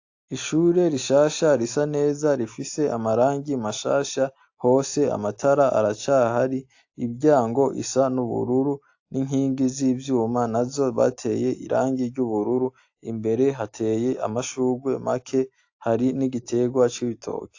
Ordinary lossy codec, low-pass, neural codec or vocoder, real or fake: AAC, 48 kbps; 7.2 kHz; none; real